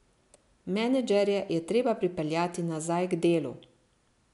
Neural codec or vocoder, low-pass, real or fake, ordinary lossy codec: none; 10.8 kHz; real; MP3, 96 kbps